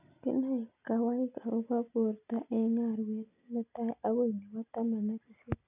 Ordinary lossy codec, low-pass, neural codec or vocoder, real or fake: none; 3.6 kHz; none; real